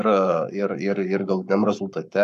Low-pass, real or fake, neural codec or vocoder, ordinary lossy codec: 14.4 kHz; fake; codec, 44.1 kHz, 7.8 kbps, Pupu-Codec; MP3, 96 kbps